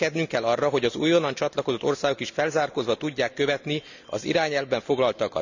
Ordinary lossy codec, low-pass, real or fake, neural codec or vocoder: none; 7.2 kHz; real; none